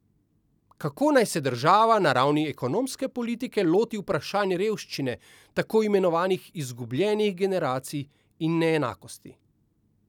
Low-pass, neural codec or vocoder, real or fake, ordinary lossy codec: 19.8 kHz; none; real; none